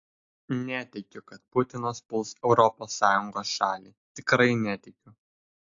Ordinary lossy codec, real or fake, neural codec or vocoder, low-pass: AAC, 48 kbps; real; none; 7.2 kHz